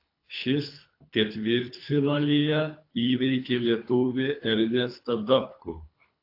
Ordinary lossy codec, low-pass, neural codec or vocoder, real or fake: AAC, 32 kbps; 5.4 kHz; codec, 24 kHz, 3 kbps, HILCodec; fake